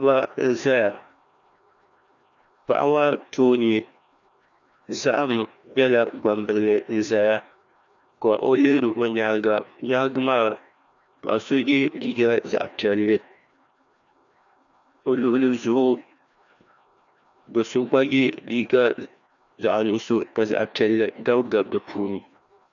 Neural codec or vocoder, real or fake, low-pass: codec, 16 kHz, 1 kbps, FreqCodec, larger model; fake; 7.2 kHz